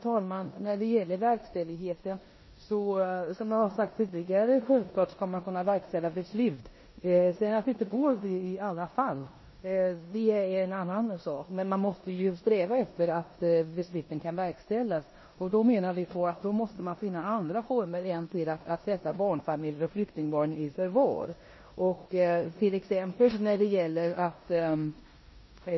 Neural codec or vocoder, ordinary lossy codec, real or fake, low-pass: codec, 16 kHz in and 24 kHz out, 0.9 kbps, LongCat-Audio-Codec, four codebook decoder; MP3, 24 kbps; fake; 7.2 kHz